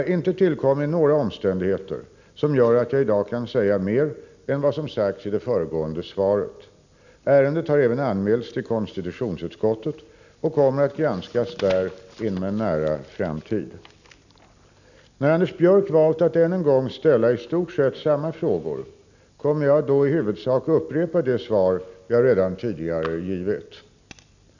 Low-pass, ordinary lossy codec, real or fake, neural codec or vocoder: 7.2 kHz; none; real; none